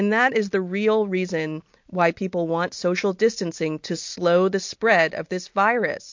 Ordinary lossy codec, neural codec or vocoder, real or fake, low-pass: MP3, 48 kbps; none; real; 7.2 kHz